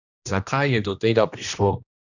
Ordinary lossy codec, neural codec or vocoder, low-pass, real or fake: none; codec, 16 kHz, 1 kbps, X-Codec, HuBERT features, trained on general audio; 7.2 kHz; fake